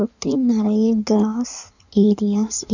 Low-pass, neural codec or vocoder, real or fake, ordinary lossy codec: 7.2 kHz; codec, 16 kHz in and 24 kHz out, 1.1 kbps, FireRedTTS-2 codec; fake; none